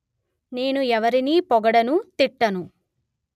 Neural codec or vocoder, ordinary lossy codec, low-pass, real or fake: none; none; 14.4 kHz; real